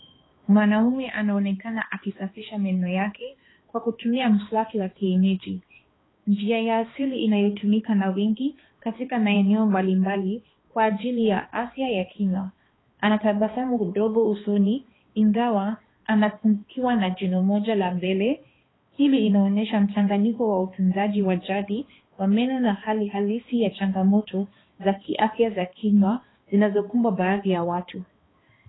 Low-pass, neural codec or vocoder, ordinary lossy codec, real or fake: 7.2 kHz; codec, 16 kHz, 2 kbps, X-Codec, HuBERT features, trained on balanced general audio; AAC, 16 kbps; fake